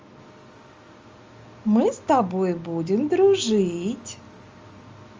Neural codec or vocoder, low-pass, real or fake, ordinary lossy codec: none; 7.2 kHz; real; Opus, 32 kbps